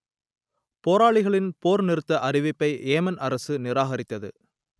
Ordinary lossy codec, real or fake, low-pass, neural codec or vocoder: none; real; none; none